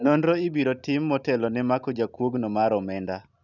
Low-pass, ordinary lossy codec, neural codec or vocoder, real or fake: 7.2 kHz; none; none; real